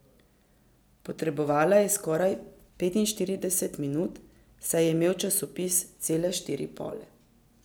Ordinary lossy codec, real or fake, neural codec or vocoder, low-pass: none; real; none; none